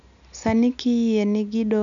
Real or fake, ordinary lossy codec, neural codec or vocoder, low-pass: real; none; none; 7.2 kHz